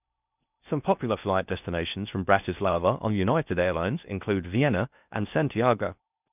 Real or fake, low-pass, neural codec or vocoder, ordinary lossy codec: fake; 3.6 kHz; codec, 16 kHz in and 24 kHz out, 0.6 kbps, FocalCodec, streaming, 4096 codes; none